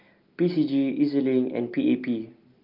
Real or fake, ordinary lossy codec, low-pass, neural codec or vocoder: real; Opus, 24 kbps; 5.4 kHz; none